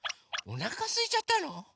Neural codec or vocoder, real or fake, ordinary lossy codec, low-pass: none; real; none; none